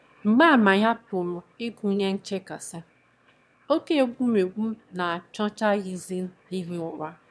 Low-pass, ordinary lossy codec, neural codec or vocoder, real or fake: none; none; autoencoder, 22.05 kHz, a latent of 192 numbers a frame, VITS, trained on one speaker; fake